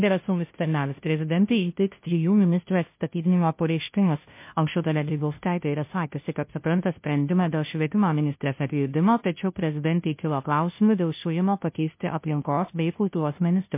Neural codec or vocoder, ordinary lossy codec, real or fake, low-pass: codec, 16 kHz, 0.5 kbps, FunCodec, trained on Chinese and English, 25 frames a second; MP3, 24 kbps; fake; 3.6 kHz